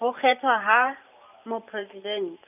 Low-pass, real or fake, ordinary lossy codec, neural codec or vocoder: 3.6 kHz; fake; none; vocoder, 44.1 kHz, 128 mel bands every 512 samples, BigVGAN v2